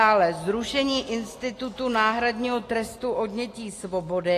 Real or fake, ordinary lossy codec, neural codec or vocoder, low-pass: real; AAC, 48 kbps; none; 14.4 kHz